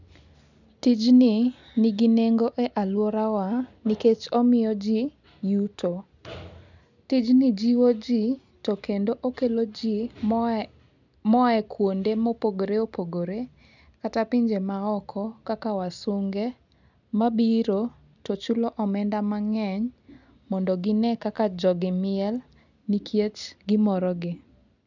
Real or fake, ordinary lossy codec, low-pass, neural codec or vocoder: real; none; 7.2 kHz; none